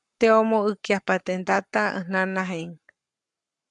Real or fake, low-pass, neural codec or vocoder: fake; 10.8 kHz; codec, 44.1 kHz, 7.8 kbps, Pupu-Codec